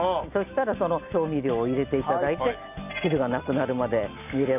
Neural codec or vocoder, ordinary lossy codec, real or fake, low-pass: none; none; real; 3.6 kHz